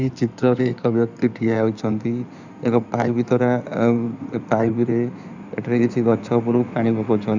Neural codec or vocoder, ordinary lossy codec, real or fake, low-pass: codec, 16 kHz in and 24 kHz out, 2.2 kbps, FireRedTTS-2 codec; none; fake; 7.2 kHz